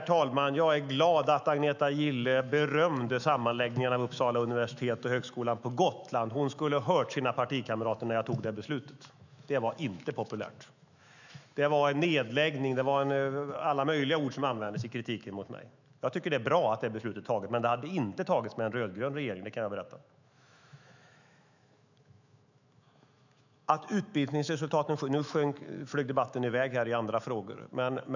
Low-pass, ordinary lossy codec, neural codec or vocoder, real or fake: 7.2 kHz; none; autoencoder, 48 kHz, 128 numbers a frame, DAC-VAE, trained on Japanese speech; fake